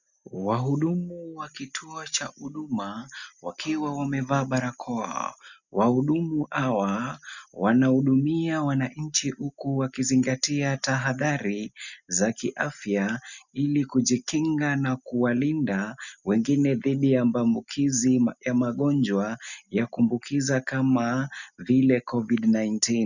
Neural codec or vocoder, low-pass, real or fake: none; 7.2 kHz; real